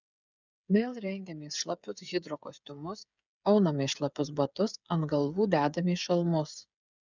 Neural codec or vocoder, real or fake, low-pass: codec, 16 kHz, 8 kbps, FreqCodec, smaller model; fake; 7.2 kHz